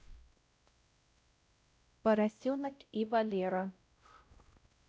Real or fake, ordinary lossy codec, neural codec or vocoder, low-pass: fake; none; codec, 16 kHz, 0.5 kbps, X-Codec, WavLM features, trained on Multilingual LibriSpeech; none